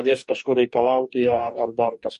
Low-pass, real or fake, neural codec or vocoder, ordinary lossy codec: 14.4 kHz; fake; codec, 44.1 kHz, 2.6 kbps, DAC; MP3, 48 kbps